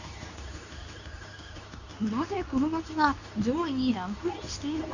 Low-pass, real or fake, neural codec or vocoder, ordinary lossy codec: 7.2 kHz; fake; codec, 24 kHz, 0.9 kbps, WavTokenizer, medium speech release version 2; none